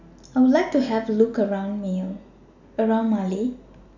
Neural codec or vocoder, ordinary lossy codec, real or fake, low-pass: none; none; real; 7.2 kHz